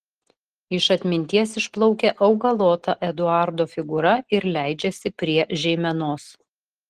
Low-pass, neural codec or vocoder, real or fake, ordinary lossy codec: 14.4 kHz; none; real; Opus, 16 kbps